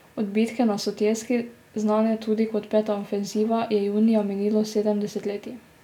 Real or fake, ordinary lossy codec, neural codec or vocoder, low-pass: real; none; none; 19.8 kHz